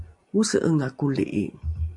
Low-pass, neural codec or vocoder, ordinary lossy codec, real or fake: 10.8 kHz; none; AAC, 64 kbps; real